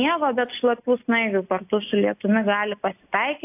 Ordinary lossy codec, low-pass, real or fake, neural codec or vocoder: AAC, 32 kbps; 3.6 kHz; real; none